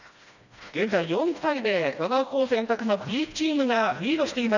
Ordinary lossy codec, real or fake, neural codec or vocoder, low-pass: none; fake; codec, 16 kHz, 1 kbps, FreqCodec, smaller model; 7.2 kHz